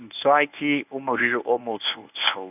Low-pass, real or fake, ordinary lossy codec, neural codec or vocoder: 3.6 kHz; fake; none; codec, 24 kHz, 0.9 kbps, WavTokenizer, medium speech release version 1